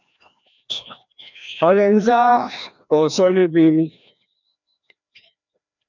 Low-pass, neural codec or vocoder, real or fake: 7.2 kHz; codec, 16 kHz, 1 kbps, FreqCodec, larger model; fake